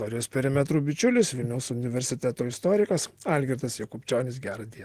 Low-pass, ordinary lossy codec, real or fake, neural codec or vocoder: 14.4 kHz; Opus, 16 kbps; real; none